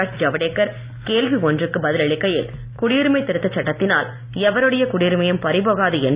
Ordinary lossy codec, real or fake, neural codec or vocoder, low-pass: Opus, 64 kbps; real; none; 3.6 kHz